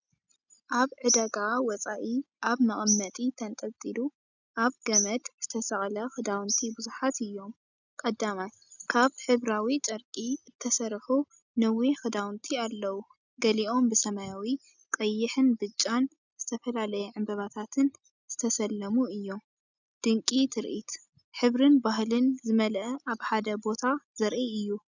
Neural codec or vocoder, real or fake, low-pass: none; real; 7.2 kHz